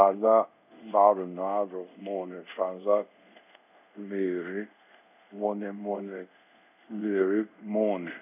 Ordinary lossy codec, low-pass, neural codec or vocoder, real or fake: none; 3.6 kHz; codec, 24 kHz, 0.5 kbps, DualCodec; fake